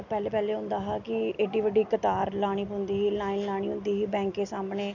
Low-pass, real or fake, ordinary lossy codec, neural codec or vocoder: 7.2 kHz; real; none; none